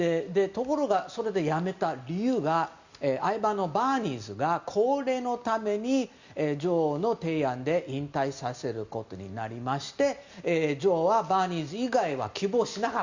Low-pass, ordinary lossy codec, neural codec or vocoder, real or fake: 7.2 kHz; Opus, 64 kbps; none; real